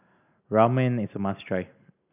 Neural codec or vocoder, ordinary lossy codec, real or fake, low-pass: none; none; real; 3.6 kHz